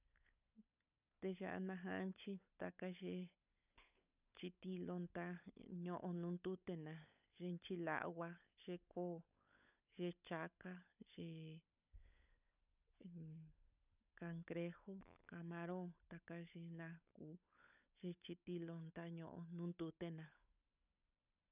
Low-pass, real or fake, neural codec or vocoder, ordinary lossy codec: 3.6 kHz; fake; codec, 16 kHz, 16 kbps, FunCodec, trained on LibriTTS, 50 frames a second; none